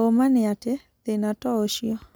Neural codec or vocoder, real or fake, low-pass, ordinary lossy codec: none; real; none; none